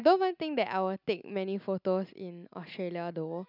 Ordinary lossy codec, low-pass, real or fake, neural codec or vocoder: none; 5.4 kHz; real; none